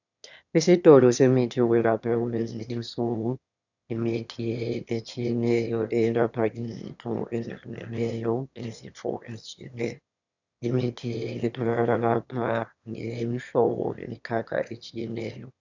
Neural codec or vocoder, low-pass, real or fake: autoencoder, 22.05 kHz, a latent of 192 numbers a frame, VITS, trained on one speaker; 7.2 kHz; fake